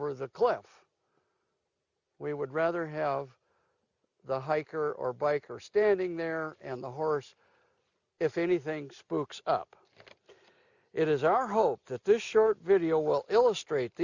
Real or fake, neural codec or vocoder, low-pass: real; none; 7.2 kHz